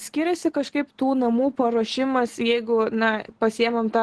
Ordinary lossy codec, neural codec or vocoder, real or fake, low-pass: Opus, 16 kbps; none; real; 10.8 kHz